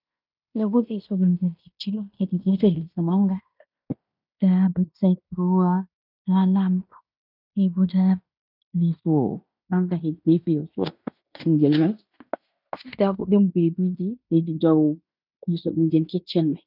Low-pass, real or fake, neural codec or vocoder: 5.4 kHz; fake; codec, 16 kHz in and 24 kHz out, 0.9 kbps, LongCat-Audio-Codec, fine tuned four codebook decoder